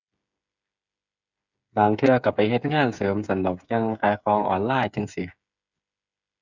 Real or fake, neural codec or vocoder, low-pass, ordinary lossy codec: fake; codec, 16 kHz, 8 kbps, FreqCodec, smaller model; 7.2 kHz; none